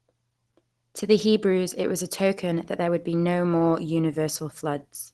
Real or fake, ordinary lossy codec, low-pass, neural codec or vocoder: real; Opus, 16 kbps; 10.8 kHz; none